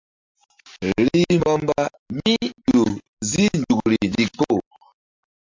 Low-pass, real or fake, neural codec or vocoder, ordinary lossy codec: 7.2 kHz; real; none; MP3, 64 kbps